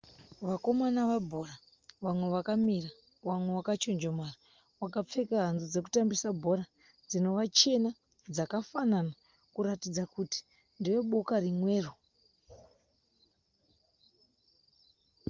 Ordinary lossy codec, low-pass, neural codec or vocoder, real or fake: Opus, 32 kbps; 7.2 kHz; none; real